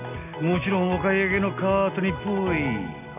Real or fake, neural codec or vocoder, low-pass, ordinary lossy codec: real; none; 3.6 kHz; none